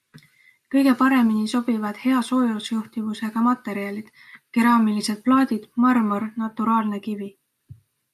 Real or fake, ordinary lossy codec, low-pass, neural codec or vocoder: real; MP3, 96 kbps; 14.4 kHz; none